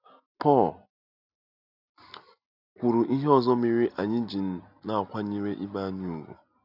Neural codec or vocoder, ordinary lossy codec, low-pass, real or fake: none; none; 5.4 kHz; real